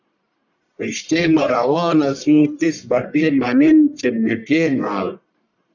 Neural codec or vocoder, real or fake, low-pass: codec, 44.1 kHz, 1.7 kbps, Pupu-Codec; fake; 7.2 kHz